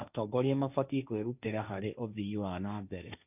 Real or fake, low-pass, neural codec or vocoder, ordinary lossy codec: fake; 3.6 kHz; codec, 16 kHz, 1.1 kbps, Voila-Tokenizer; none